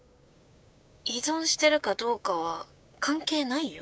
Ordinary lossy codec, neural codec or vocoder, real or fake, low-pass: none; codec, 16 kHz, 6 kbps, DAC; fake; none